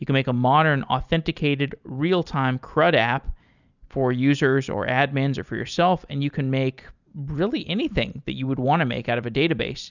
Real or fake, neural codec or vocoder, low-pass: real; none; 7.2 kHz